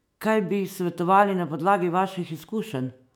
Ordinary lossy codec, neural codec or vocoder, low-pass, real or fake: none; autoencoder, 48 kHz, 128 numbers a frame, DAC-VAE, trained on Japanese speech; 19.8 kHz; fake